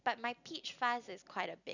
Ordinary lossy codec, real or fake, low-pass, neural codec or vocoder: none; real; 7.2 kHz; none